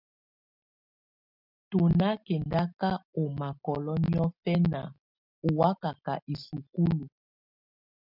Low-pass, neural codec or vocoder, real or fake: 5.4 kHz; none; real